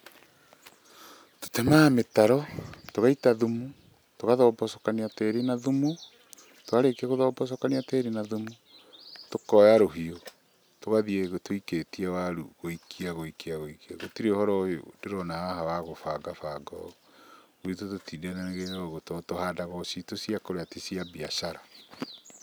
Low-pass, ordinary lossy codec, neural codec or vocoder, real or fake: none; none; none; real